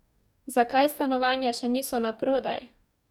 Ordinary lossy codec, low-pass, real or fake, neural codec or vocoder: none; 19.8 kHz; fake; codec, 44.1 kHz, 2.6 kbps, DAC